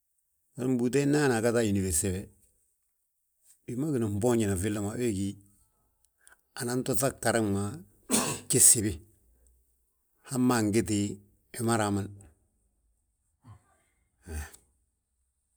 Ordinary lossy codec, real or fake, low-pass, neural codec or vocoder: none; real; none; none